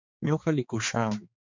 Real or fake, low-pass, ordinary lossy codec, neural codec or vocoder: fake; 7.2 kHz; MP3, 64 kbps; codec, 16 kHz, 2 kbps, X-Codec, HuBERT features, trained on balanced general audio